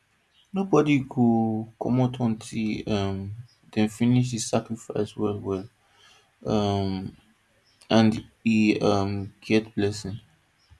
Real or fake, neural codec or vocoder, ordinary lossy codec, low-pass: real; none; none; none